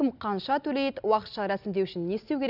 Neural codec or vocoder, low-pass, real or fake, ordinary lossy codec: none; 5.4 kHz; real; none